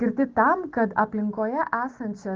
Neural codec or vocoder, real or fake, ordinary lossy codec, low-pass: none; real; Opus, 16 kbps; 7.2 kHz